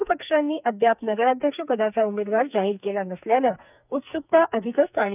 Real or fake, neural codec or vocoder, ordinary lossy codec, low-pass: fake; codec, 44.1 kHz, 2.6 kbps, SNAC; none; 3.6 kHz